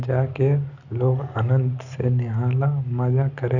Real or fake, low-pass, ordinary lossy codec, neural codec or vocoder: real; 7.2 kHz; none; none